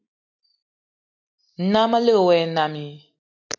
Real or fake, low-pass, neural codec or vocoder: real; 7.2 kHz; none